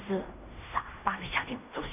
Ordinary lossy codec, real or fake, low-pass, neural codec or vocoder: none; fake; 3.6 kHz; codec, 16 kHz in and 24 kHz out, 0.4 kbps, LongCat-Audio-Codec, fine tuned four codebook decoder